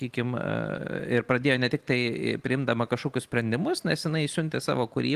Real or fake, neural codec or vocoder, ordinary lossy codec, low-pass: real; none; Opus, 24 kbps; 14.4 kHz